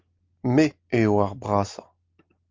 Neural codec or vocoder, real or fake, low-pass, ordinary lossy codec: none; real; 7.2 kHz; Opus, 32 kbps